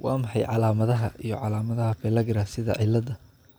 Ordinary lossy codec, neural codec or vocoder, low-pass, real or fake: none; none; none; real